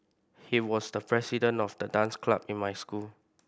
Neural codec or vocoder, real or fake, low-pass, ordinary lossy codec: none; real; none; none